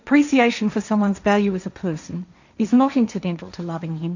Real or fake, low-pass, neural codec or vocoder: fake; 7.2 kHz; codec, 16 kHz, 1.1 kbps, Voila-Tokenizer